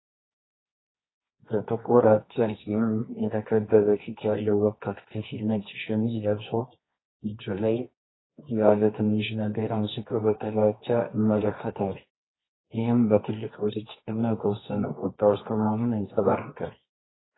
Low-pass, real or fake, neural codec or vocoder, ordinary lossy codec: 7.2 kHz; fake; codec, 24 kHz, 0.9 kbps, WavTokenizer, medium music audio release; AAC, 16 kbps